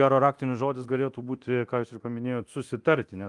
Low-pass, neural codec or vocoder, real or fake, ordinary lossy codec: 10.8 kHz; codec, 24 kHz, 0.9 kbps, DualCodec; fake; Opus, 32 kbps